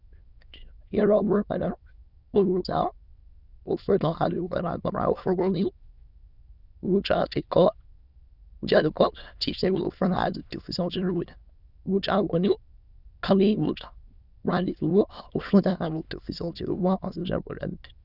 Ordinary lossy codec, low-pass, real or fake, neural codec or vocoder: Opus, 64 kbps; 5.4 kHz; fake; autoencoder, 22.05 kHz, a latent of 192 numbers a frame, VITS, trained on many speakers